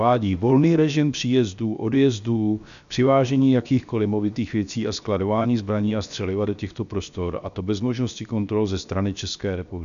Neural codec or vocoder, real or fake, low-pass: codec, 16 kHz, 0.7 kbps, FocalCodec; fake; 7.2 kHz